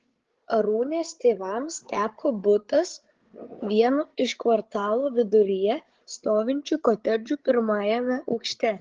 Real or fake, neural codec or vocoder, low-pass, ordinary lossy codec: fake; codec, 16 kHz, 8 kbps, FreqCodec, larger model; 7.2 kHz; Opus, 16 kbps